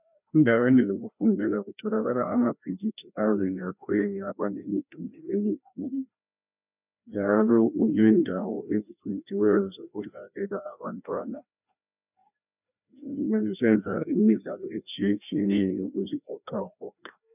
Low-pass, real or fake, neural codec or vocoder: 3.6 kHz; fake; codec, 16 kHz, 1 kbps, FreqCodec, larger model